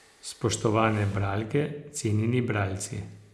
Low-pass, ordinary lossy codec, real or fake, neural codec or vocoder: none; none; real; none